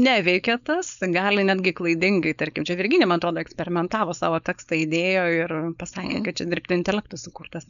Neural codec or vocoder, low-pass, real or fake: codec, 16 kHz, 4.8 kbps, FACodec; 7.2 kHz; fake